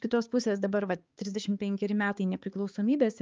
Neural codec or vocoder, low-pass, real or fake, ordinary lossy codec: codec, 16 kHz, 4 kbps, X-Codec, HuBERT features, trained on balanced general audio; 7.2 kHz; fake; Opus, 24 kbps